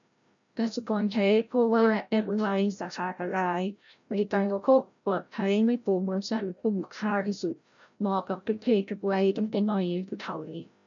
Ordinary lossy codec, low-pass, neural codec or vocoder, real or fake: none; 7.2 kHz; codec, 16 kHz, 0.5 kbps, FreqCodec, larger model; fake